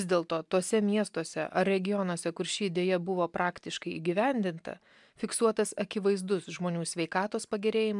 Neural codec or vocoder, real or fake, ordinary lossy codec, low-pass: none; real; MP3, 96 kbps; 10.8 kHz